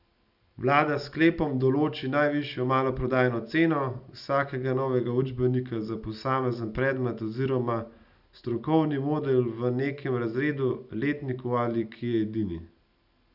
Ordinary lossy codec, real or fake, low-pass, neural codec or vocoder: none; real; 5.4 kHz; none